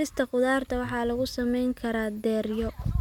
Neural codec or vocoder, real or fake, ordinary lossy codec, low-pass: none; real; none; 19.8 kHz